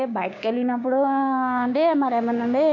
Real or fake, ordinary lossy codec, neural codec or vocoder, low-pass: fake; none; codec, 16 kHz, 6 kbps, DAC; 7.2 kHz